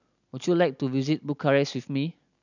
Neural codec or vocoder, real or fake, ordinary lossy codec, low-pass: none; real; none; 7.2 kHz